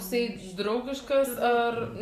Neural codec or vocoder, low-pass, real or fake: none; 14.4 kHz; real